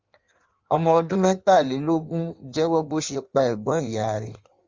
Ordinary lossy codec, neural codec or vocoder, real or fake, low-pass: Opus, 32 kbps; codec, 16 kHz in and 24 kHz out, 1.1 kbps, FireRedTTS-2 codec; fake; 7.2 kHz